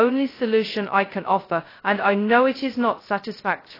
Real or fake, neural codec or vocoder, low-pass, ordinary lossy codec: fake; codec, 16 kHz, 0.2 kbps, FocalCodec; 5.4 kHz; AAC, 24 kbps